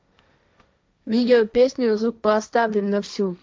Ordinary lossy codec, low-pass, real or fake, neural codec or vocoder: none; none; fake; codec, 16 kHz, 1.1 kbps, Voila-Tokenizer